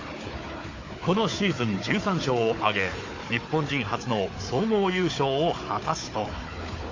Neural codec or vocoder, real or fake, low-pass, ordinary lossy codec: codec, 16 kHz, 4 kbps, FunCodec, trained on Chinese and English, 50 frames a second; fake; 7.2 kHz; AAC, 32 kbps